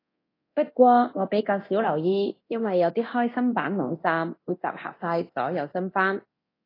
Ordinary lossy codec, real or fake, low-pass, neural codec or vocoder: AAC, 24 kbps; fake; 5.4 kHz; codec, 24 kHz, 0.9 kbps, DualCodec